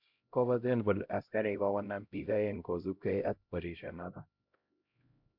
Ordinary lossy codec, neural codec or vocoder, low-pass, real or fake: MP3, 48 kbps; codec, 16 kHz, 0.5 kbps, X-Codec, HuBERT features, trained on LibriSpeech; 5.4 kHz; fake